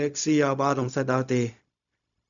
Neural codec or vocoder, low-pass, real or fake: codec, 16 kHz, 0.4 kbps, LongCat-Audio-Codec; 7.2 kHz; fake